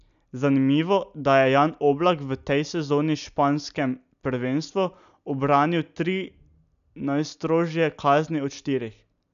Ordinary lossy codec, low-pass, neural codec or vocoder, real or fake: MP3, 96 kbps; 7.2 kHz; none; real